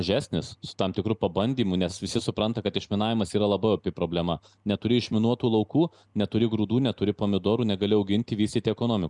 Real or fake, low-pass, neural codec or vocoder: real; 10.8 kHz; none